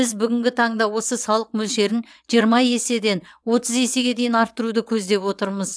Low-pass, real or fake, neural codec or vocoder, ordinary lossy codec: none; fake; vocoder, 22.05 kHz, 80 mel bands, WaveNeXt; none